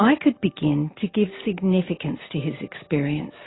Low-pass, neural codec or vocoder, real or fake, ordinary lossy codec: 7.2 kHz; none; real; AAC, 16 kbps